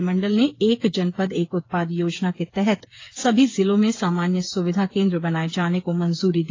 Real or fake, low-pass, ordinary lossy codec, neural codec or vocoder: fake; 7.2 kHz; AAC, 32 kbps; codec, 16 kHz, 8 kbps, FreqCodec, smaller model